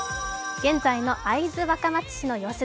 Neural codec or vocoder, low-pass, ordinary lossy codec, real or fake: none; none; none; real